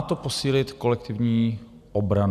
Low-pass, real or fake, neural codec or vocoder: 14.4 kHz; real; none